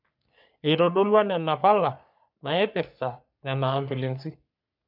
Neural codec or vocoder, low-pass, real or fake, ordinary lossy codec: codec, 44.1 kHz, 3.4 kbps, Pupu-Codec; 5.4 kHz; fake; none